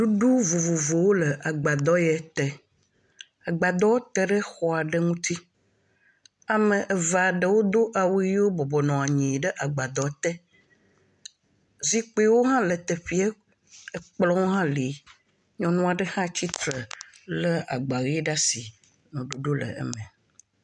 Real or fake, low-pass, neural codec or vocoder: real; 10.8 kHz; none